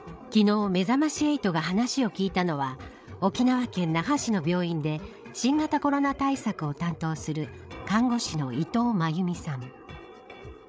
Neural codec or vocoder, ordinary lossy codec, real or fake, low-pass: codec, 16 kHz, 8 kbps, FreqCodec, larger model; none; fake; none